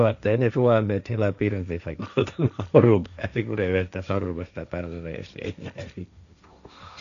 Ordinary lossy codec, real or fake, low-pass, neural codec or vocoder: none; fake; 7.2 kHz; codec, 16 kHz, 1.1 kbps, Voila-Tokenizer